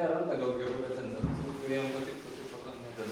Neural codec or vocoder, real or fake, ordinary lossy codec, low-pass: none; real; Opus, 24 kbps; 19.8 kHz